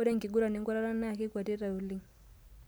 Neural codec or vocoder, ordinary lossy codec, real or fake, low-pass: none; none; real; none